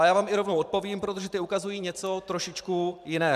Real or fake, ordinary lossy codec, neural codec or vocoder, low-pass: fake; MP3, 96 kbps; vocoder, 44.1 kHz, 128 mel bands every 512 samples, BigVGAN v2; 14.4 kHz